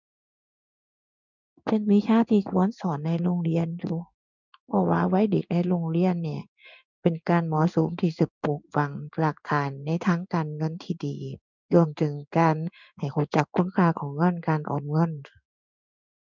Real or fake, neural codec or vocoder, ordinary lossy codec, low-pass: fake; codec, 16 kHz in and 24 kHz out, 1 kbps, XY-Tokenizer; none; 7.2 kHz